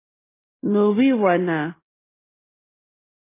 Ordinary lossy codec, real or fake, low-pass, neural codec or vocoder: MP3, 16 kbps; real; 3.6 kHz; none